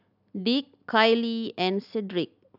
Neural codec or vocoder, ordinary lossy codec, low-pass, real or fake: none; none; 5.4 kHz; real